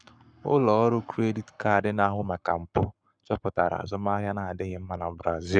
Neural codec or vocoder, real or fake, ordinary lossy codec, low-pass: codec, 44.1 kHz, 7.8 kbps, Pupu-Codec; fake; none; 9.9 kHz